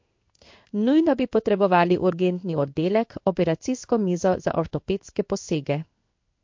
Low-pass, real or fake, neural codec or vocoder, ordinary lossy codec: 7.2 kHz; fake; codec, 16 kHz in and 24 kHz out, 1 kbps, XY-Tokenizer; MP3, 48 kbps